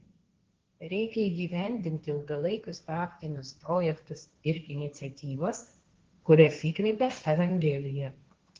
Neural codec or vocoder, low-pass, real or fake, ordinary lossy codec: codec, 16 kHz, 1.1 kbps, Voila-Tokenizer; 7.2 kHz; fake; Opus, 16 kbps